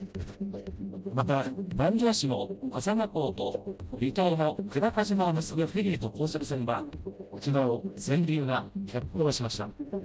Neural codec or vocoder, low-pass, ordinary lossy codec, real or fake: codec, 16 kHz, 0.5 kbps, FreqCodec, smaller model; none; none; fake